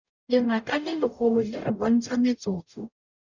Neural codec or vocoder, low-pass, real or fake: codec, 44.1 kHz, 0.9 kbps, DAC; 7.2 kHz; fake